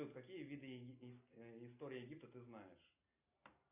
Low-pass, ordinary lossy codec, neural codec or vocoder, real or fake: 3.6 kHz; AAC, 32 kbps; none; real